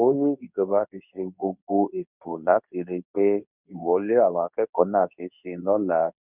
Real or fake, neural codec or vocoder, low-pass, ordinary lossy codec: fake; codec, 24 kHz, 0.9 kbps, WavTokenizer, medium speech release version 2; 3.6 kHz; none